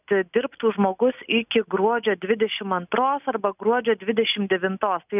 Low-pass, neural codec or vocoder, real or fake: 3.6 kHz; none; real